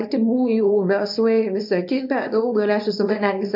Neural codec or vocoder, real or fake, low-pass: codec, 24 kHz, 0.9 kbps, WavTokenizer, small release; fake; 5.4 kHz